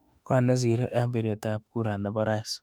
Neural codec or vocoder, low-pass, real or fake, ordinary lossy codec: autoencoder, 48 kHz, 32 numbers a frame, DAC-VAE, trained on Japanese speech; 19.8 kHz; fake; none